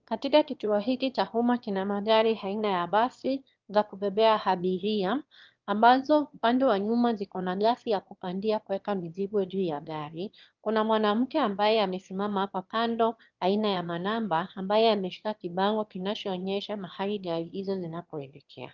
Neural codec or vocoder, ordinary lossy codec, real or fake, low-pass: autoencoder, 22.05 kHz, a latent of 192 numbers a frame, VITS, trained on one speaker; Opus, 32 kbps; fake; 7.2 kHz